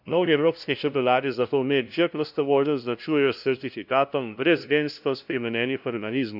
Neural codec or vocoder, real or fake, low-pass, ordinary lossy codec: codec, 16 kHz, 0.5 kbps, FunCodec, trained on LibriTTS, 25 frames a second; fake; 5.4 kHz; none